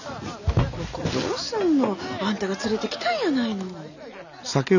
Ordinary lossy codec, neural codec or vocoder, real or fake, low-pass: none; none; real; 7.2 kHz